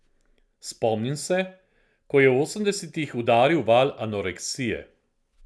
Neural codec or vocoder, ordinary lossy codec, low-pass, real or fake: none; none; none; real